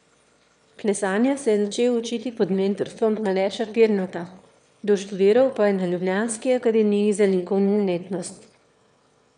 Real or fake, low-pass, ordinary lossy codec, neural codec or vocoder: fake; 9.9 kHz; none; autoencoder, 22.05 kHz, a latent of 192 numbers a frame, VITS, trained on one speaker